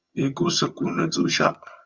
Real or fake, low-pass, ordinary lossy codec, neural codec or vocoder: fake; 7.2 kHz; Opus, 64 kbps; vocoder, 22.05 kHz, 80 mel bands, HiFi-GAN